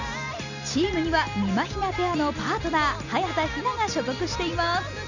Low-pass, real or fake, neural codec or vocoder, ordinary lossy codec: 7.2 kHz; real; none; none